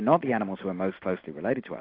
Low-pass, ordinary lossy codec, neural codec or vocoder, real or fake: 5.4 kHz; AAC, 32 kbps; none; real